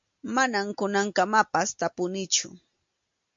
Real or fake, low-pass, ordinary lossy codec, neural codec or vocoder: real; 7.2 kHz; MP3, 48 kbps; none